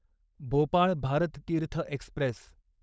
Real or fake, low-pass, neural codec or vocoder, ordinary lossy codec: fake; none; codec, 16 kHz, 4.8 kbps, FACodec; none